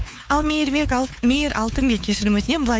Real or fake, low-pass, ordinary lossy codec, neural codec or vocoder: fake; none; none; codec, 16 kHz, 4 kbps, X-Codec, WavLM features, trained on Multilingual LibriSpeech